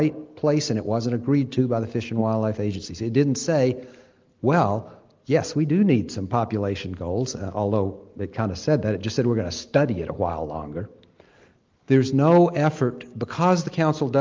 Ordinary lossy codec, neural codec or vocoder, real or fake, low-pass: Opus, 32 kbps; none; real; 7.2 kHz